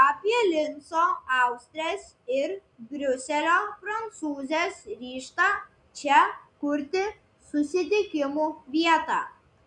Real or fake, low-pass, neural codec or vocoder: real; 10.8 kHz; none